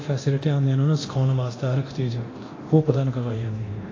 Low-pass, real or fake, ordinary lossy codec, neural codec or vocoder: 7.2 kHz; fake; AAC, 32 kbps; codec, 24 kHz, 0.9 kbps, DualCodec